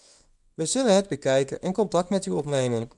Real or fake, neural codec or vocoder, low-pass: fake; codec, 24 kHz, 0.9 kbps, WavTokenizer, small release; 10.8 kHz